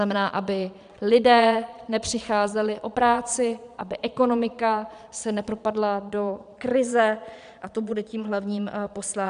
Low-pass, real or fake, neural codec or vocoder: 9.9 kHz; fake; vocoder, 22.05 kHz, 80 mel bands, Vocos